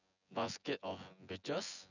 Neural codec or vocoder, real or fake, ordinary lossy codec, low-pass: vocoder, 24 kHz, 100 mel bands, Vocos; fake; none; 7.2 kHz